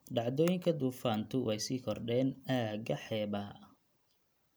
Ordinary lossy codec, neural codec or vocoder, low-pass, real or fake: none; none; none; real